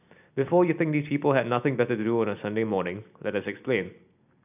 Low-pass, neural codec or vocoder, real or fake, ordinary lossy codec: 3.6 kHz; none; real; none